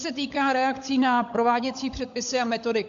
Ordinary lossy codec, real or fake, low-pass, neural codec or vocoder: AAC, 64 kbps; fake; 7.2 kHz; codec, 16 kHz, 16 kbps, FunCodec, trained on LibriTTS, 50 frames a second